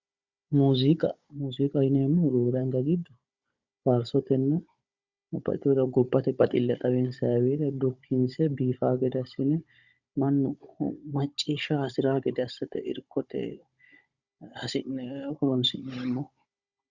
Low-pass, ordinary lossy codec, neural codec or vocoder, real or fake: 7.2 kHz; Opus, 64 kbps; codec, 16 kHz, 16 kbps, FunCodec, trained on Chinese and English, 50 frames a second; fake